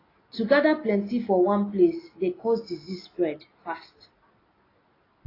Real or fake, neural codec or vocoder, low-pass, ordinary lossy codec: real; none; 5.4 kHz; AAC, 24 kbps